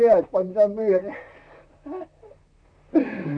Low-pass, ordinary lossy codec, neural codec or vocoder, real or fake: 9.9 kHz; none; vocoder, 44.1 kHz, 128 mel bands, Pupu-Vocoder; fake